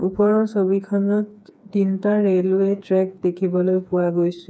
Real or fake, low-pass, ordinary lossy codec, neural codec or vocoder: fake; none; none; codec, 16 kHz, 4 kbps, FreqCodec, smaller model